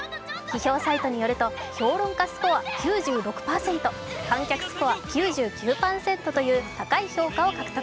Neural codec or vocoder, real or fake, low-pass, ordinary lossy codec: none; real; none; none